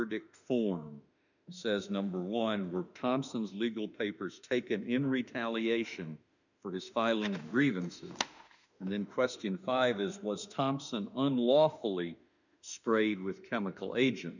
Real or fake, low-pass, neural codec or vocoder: fake; 7.2 kHz; autoencoder, 48 kHz, 32 numbers a frame, DAC-VAE, trained on Japanese speech